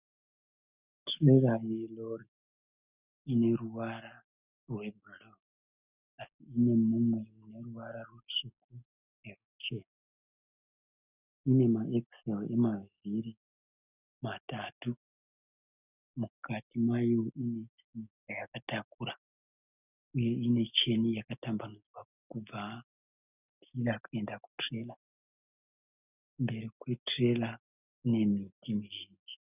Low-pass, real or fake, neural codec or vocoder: 3.6 kHz; real; none